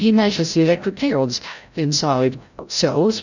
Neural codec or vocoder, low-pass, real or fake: codec, 16 kHz, 0.5 kbps, FreqCodec, larger model; 7.2 kHz; fake